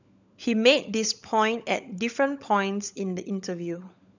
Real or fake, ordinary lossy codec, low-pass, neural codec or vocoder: fake; none; 7.2 kHz; codec, 16 kHz, 16 kbps, FunCodec, trained on LibriTTS, 50 frames a second